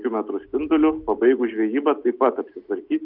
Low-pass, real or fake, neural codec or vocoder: 5.4 kHz; real; none